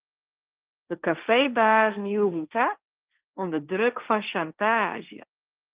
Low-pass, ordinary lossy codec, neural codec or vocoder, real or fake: 3.6 kHz; Opus, 32 kbps; codec, 16 kHz, 1.1 kbps, Voila-Tokenizer; fake